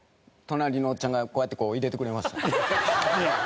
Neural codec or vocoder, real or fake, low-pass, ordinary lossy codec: none; real; none; none